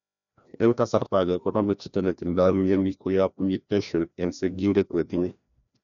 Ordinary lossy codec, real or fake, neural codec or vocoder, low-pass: none; fake; codec, 16 kHz, 1 kbps, FreqCodec, larger model; 7.2 kHz